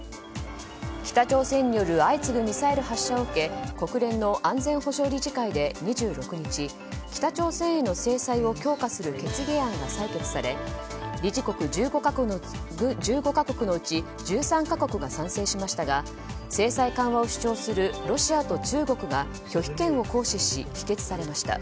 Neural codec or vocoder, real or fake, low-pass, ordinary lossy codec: none; real; none; none